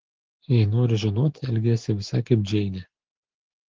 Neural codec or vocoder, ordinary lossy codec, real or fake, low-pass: none; Opus, 16 kbps; real; 7.2 kHz